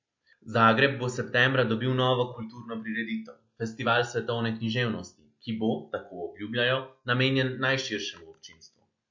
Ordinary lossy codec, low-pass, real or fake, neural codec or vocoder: MP3, 48 kbps; 7.2 kHz; real; none